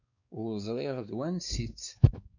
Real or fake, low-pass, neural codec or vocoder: fake; 7.2 kHz; codec, 16 kHz, 4 kbps, X-Codec, WavLM features, trained on Multilingual LibriSpeech